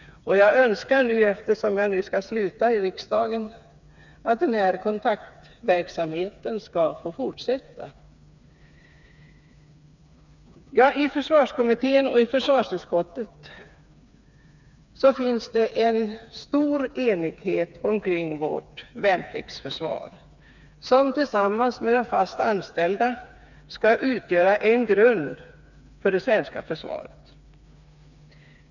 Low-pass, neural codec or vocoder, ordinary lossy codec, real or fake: 7.2 kHz; codec, 16 kHz, 4 kbps, FreqCodec, smaller model; none; fake